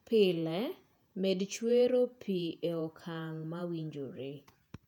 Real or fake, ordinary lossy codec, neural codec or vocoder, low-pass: fake; none; vocoder, 48 kHz, 128 mel bands, Vocos; 19.8 kHz